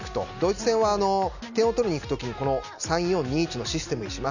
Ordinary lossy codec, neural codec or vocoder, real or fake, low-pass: none; none; real; 7.2 kHz